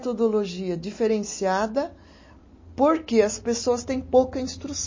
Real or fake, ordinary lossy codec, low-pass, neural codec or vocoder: real; MP3, 32 kbps; 7.2 kHz; none